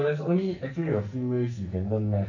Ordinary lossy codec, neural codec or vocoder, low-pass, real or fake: none; codec, 44.1 kHz, 2.6 kbps, SNAC; 7.2 kHz; fake